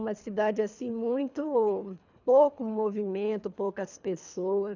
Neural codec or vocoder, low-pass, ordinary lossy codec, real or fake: codec, 24 kHz, 3 kbps, HILCodec; 7.2 kHz; none; fake